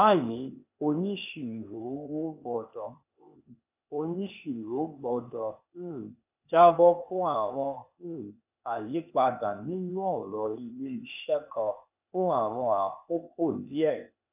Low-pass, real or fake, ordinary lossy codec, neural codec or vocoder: 3.6 kHz; fake; none; codec, 16 kHz, 0.8 kbps, ZipCodec